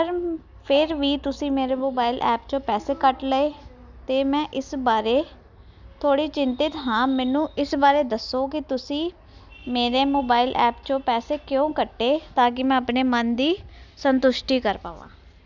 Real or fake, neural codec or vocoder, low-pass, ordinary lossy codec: real; none; 7.2 kHz; none